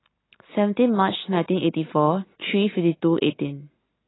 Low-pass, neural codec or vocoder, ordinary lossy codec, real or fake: 7.2 kHz; none; AAC, 16 kbps; real